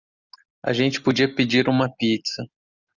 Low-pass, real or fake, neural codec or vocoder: 7.2 kHz; real; none